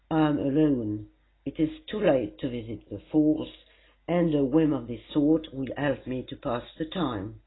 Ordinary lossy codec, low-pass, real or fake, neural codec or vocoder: AAC, 16 kbps; 7.2 kHz; real; none